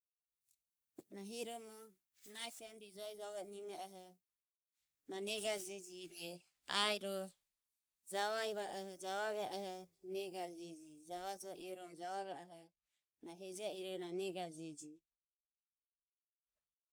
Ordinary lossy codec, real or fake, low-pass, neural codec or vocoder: none; fake; none; codec, 44.1 kHz, 3.4 kbps, Pupu-Codec